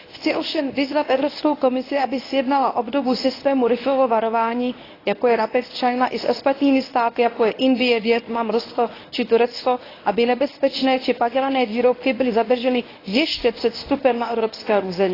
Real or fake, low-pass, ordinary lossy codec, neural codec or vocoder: fake; 5.4 kHz; AAC, 24 kbps; codec, 24 kHz, 0.9 kbps, WavTokenizer, medium speech release version 1